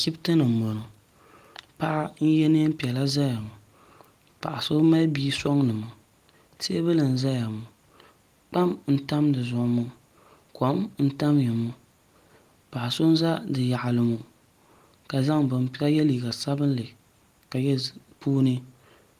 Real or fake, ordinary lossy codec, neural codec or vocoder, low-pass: real; Opus, 24 kbps; none; 14.4 kHz